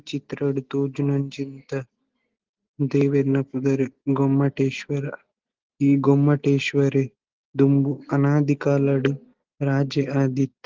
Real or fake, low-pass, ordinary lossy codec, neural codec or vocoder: real; 7.2 kHz; Opus, 16 kbps; none